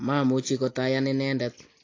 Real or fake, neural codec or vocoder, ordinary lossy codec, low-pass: real; none; AAC, 32 kbps; 7.2 kHz